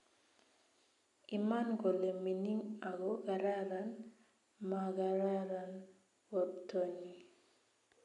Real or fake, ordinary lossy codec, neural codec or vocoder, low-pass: real; none; none; 10.8 kHz